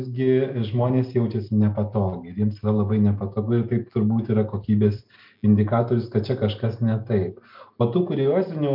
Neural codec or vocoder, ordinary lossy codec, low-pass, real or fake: none; MP3, 48 kbps; 5.4 kHz; real